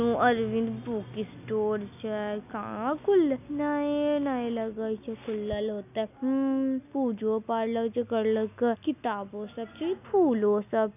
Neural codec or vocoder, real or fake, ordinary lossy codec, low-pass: none; real; none; 3.6 kHz